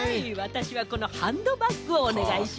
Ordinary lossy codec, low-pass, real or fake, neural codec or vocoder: none; none; real; none